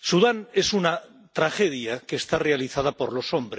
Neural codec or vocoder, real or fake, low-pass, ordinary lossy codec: none; real; none; none